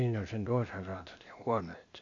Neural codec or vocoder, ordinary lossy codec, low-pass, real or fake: codec, 16 kHz, 0.8 kbps, ZipCodec; AAC, 48 kbps; 7.2 kHz; fake